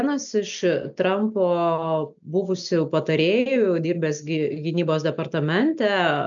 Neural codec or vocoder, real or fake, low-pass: none; real; 7.2 kHz